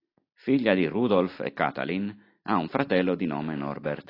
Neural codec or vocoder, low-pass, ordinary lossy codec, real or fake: none; 5.4 kHz; AAC, 24 kbps; real